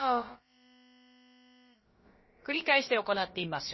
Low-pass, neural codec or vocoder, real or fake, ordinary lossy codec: 7.2 kHz; codec, 16 kHz, about 1 kbps, DyCAST, with the encoder's durations; fake; MP3, 24 kbps